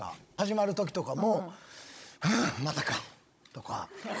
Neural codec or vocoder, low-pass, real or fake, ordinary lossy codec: codec, 16 kHz, 16 kbps, FunCodec, trained on Chinese and English, 50 frames a second; none; fake; none